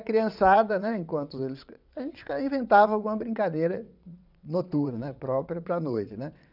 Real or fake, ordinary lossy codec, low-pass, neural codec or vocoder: fake; none; 5.4 kHz; vocoder, 22.05 kHz, 80 mel bands, WaveNeXt